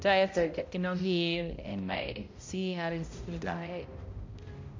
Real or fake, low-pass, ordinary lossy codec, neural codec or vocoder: fake; 7.2 kHz; MP3, 48 kbps; codec, 16 kHz, 0.5 kbps, X-Codec, HuBERT features, trained on balanced general audio